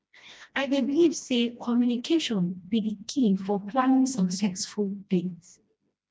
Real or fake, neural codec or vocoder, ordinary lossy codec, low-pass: fake; codec, 16 kHz, 1 kbps, FreqCodec, smaller model; none; none